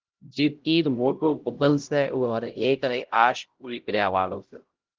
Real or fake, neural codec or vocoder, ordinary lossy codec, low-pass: fake; codec, 16 kHz, 0.5 kbps, X-Codec, HuBERT features, trained on LibriSpeech; Opus, 16 kbps; 7.2 kHz